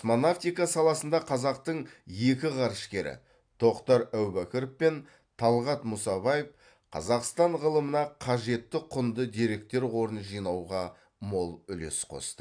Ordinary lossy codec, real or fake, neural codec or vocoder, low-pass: AAC, 64 kbps; real; none; 9.9 kHz